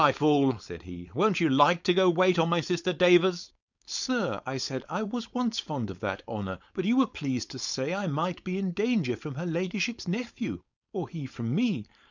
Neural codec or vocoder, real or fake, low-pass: codec, 16 kHz, 4.8 kbps, FACodec; fake; 7.2 kHz